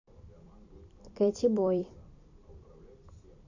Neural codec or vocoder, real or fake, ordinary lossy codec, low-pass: vocoder, 44.1 kHz, 128 mel bands every 256 samples, BigVGAN v2; fake; none; 7.2 kHz